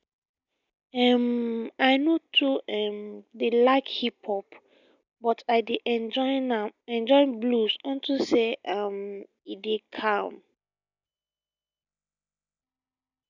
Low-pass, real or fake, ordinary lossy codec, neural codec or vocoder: 7.2 kHz; real; none; none